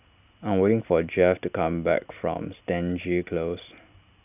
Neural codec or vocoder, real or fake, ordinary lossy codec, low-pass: none; real; none; 3.6 kHz